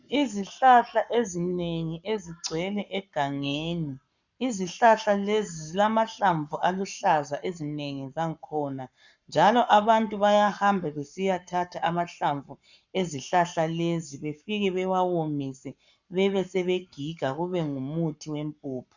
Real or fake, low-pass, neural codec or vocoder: fake; 7.2 kHz; codec, 44.1 kHz, 7.8 kbps, Pupu-Codec